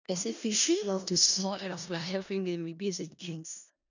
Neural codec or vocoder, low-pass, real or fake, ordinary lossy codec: codec, 16 kHz in and 24 kHz out, 0.4 kbps, LongCat-Audio-Codec, four codebook decoder; 7.2 kHz; fake; none